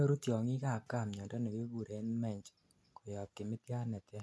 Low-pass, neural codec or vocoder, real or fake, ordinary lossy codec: none; none; real; none